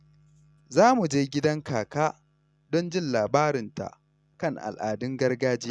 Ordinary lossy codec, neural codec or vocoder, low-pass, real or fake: none; none; none; real